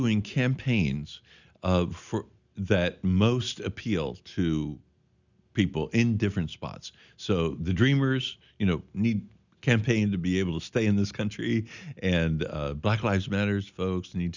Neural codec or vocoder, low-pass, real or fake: none; 7.2 kHz; real